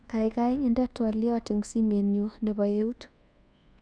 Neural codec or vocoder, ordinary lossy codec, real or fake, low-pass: codec, 24 kHz, 1.2 kbps, DualCodec; none; fake; 9.9 kHz